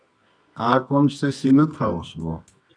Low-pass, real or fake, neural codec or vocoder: 9.9 kHz; fake; codec, 24 kHz, 0.9 kbps, WavTokenizer, medium music audio release